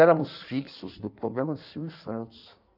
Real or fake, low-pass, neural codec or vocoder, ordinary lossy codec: fake; 5.4 kHz; codec, 16 kHz in and 24 kHz out, 1.1 kbps, FireRedTTS-2 codec; none